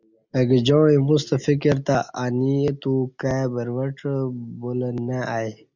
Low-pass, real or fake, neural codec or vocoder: 7.2 kHz; real; none